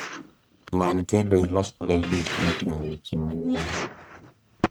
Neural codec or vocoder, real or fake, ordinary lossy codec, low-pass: codec, 44.1 kHz, 1.7 kbps, Pupu-Codec; fake; none; none